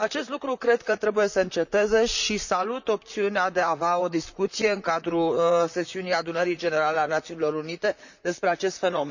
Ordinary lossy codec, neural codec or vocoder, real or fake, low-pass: none; vocoder, 44.1 kHz, 128 mel bands, Pupu-Vocoder; fake; 7.2 kHz